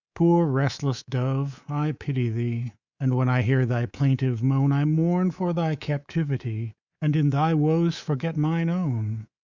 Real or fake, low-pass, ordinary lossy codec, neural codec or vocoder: fake; 7.2 kHz; Opus, 64 kbps; codec, 24 kHz, 3.1 kbps, DualCodec